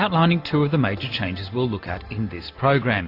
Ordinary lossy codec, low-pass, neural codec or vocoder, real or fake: AAC, 32 kbps; 5.4 kHz; none; real